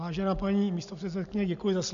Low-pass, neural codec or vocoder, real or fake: 7.2 kHz; none; real